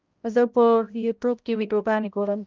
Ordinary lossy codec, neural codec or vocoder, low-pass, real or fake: Opus, 32 kbps; codec, 16 kHz, 0.5 kbps, X-Codec, HuBERT features, trained on balanced general audio; 7.2 kHz; fake